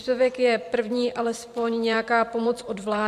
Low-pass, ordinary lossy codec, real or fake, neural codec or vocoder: 14.4 kHz; MP3, 64 kbps; fake; vocoder, 44.1 kHz, 128 mel bands every 512 samples, BigVGAN v2